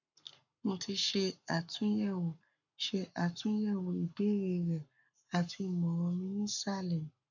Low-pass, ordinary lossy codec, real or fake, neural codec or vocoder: 7.2 kHz; none; fake; codec, 44.1 kHz, 7.8 kbps, Pupu-Codec